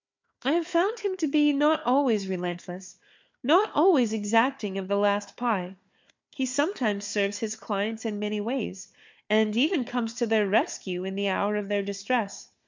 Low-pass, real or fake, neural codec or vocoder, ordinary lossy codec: 7.2 kHz; fake; codec, 16 kHz, 4 kbps, FunCodec, trained on Chinese and English, 50 frames a second; MP3, 64 kbps